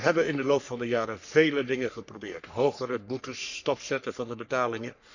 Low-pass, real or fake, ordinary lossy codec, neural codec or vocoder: 7.2 kHz; fake; none; codec, 44.1 kHz, 3.4 kbps, Pupu-Codec